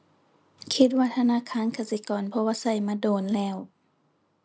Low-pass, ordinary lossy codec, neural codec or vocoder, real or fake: none; none; none; real